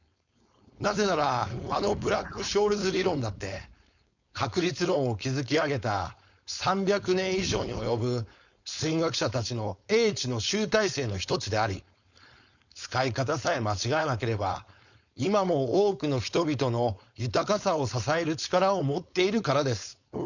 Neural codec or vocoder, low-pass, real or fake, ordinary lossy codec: codec, 16 kHz, 4.8 kbps, FACodec; 7.2 kHz; fake; none